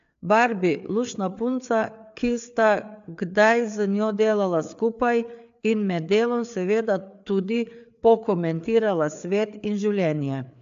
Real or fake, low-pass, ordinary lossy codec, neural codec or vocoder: fake; 7.2 kHz; AAC, 64 kbps; codec, 16 kHz, 4 kbps, FreqCodec, larger model